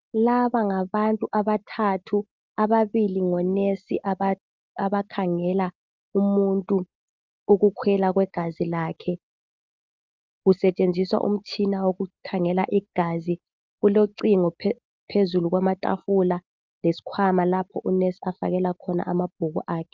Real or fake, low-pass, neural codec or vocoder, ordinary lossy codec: real; 7.2 kHz; none; Opus, 24 kbps